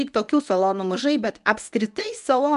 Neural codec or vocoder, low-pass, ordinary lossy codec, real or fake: codec, 24 kHz, 0.9 kbps, WavTokenizer, medium speech release version 1; 10.8 kHz; AAC, 96 kbps; fake